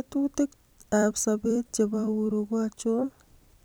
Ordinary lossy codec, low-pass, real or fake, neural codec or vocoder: none; none; fake; vocoder, 44.1 kHz, 128 mel bands every 256 samples, BigVGAN v2